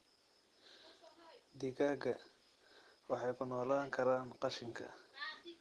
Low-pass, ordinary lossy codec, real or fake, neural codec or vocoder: 10.8 kHz; Opus, 16 kbps; real; none